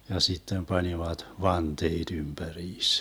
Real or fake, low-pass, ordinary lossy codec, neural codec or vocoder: real; none; none; none